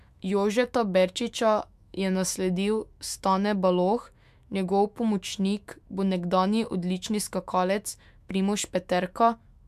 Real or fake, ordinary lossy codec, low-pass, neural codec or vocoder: fake; MP3, 96 kbps; 14.4 kHz; autoencoder, 48 kHz, 128 numbers a frame, DAC-VAE, trained on Japanese speech